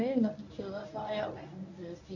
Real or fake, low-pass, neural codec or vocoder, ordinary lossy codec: fake; 7.2 kHz; codec, 24 kHz, 0.9 kbps, WavTokenizer, medium speech release version 1; none